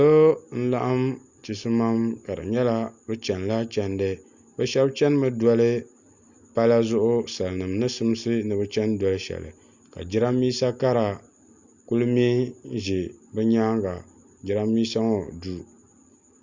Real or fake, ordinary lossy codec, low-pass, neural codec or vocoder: real; Opus, 64 kbps; 7.2 kHz; none